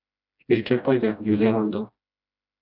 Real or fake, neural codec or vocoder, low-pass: fake; codec, 16 kHz, 1 kbps, FreqCodec, smaller model; 5.4 kHz